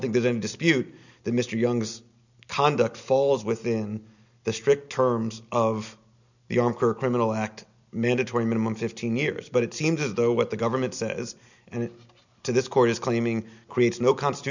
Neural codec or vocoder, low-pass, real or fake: none; 7.2 kHz; real